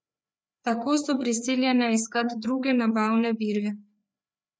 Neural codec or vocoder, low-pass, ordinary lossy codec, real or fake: codec, 16 kHz, 4 kbps, FreqCodec, larger model; none; none; fake